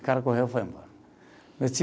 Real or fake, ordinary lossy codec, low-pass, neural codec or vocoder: real; none; none; none